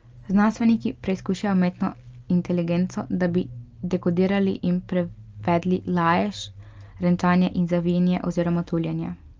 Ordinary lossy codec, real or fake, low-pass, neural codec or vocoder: Opus, 24 kbps; real; 7.2 kHz; none